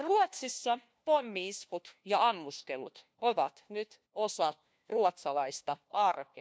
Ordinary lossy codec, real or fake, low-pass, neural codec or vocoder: none; fake; none; codec, 16 kHz, 1 kbps, FunCodec, trained on LibriTTS, 50 frames a second